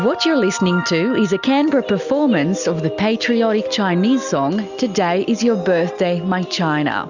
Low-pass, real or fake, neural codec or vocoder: 7.2 kHz; real; none